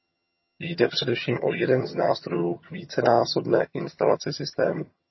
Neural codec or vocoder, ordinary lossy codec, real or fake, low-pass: vocoder, 22.05 kHz, 80 mel bands, HiFi-GAN; MP3, 24 kbps; fake; 7.2 kHz